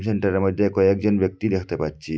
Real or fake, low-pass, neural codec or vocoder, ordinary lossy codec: real; none; none; none